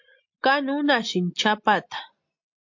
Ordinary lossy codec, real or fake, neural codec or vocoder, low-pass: MP3, 48 kbps; real; none; 7.2 kHz